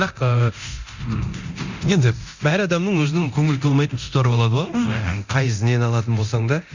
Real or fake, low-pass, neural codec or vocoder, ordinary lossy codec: fake; 7.2 kHz; codec, 24 kHz, 0.9 kbps, DualCodec; Opus, 64 kbps